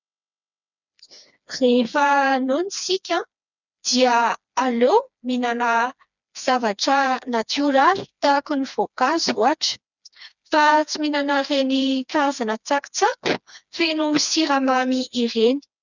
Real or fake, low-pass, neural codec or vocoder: fake; 7.2 kHz; codec, 16 kHz, 2 kbps, FreqCodec, smaller model